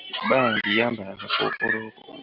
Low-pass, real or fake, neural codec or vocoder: 5.4 kHz; real; none